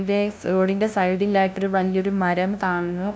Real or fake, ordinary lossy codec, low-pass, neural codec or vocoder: fake; none; none; codec, 16 kHz, 0.5 kbps, FunCodec, trained on LibriTTS, 25 frames a second